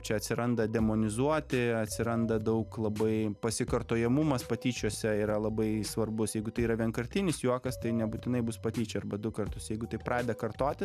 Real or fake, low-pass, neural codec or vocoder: real; 14.4 kHz; none